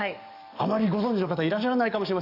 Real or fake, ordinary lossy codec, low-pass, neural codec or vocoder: fake; none; 5.4 kHz; codec, 44.1 kHz, 7.8 kbps, DAC